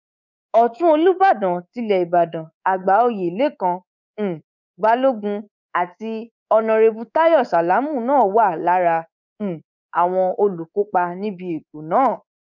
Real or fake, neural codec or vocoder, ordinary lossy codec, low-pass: fake; codec, 24 kHz, 3.1 kbps, DualCodec; none; 7.2 kHz